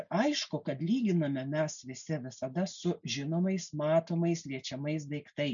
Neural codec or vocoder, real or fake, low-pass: none; real; 7.2 kHz